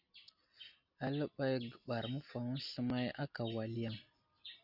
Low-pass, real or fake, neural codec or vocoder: 5.4 kHz; real; none